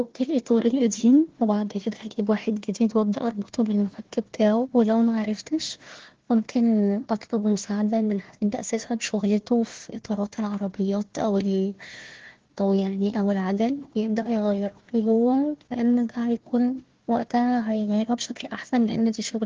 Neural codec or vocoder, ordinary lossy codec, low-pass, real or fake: codec, 16 kHz, 1 kbps, FunCodec, trained on Chinese and English, 50 frames a second; Opus, 16 kbps; 7.2 kHz; fake